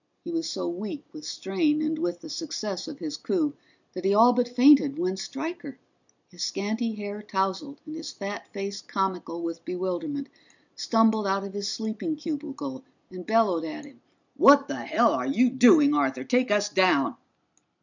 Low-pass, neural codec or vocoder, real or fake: 7.2 kHz; none; real